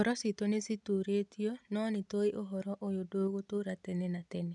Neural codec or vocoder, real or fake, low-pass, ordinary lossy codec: none; real; 10.8 kHz; none